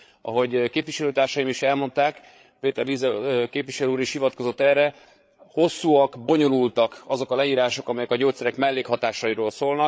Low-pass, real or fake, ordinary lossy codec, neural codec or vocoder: none; fake; none; codec, 16 kHz, 8 kbps, FreqCodec, larger model